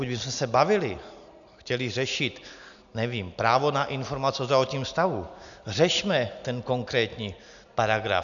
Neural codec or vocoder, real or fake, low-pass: none; real; 7.2 kHz